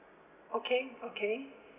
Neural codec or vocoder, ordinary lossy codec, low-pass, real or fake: none; none; 3.6 kHz; real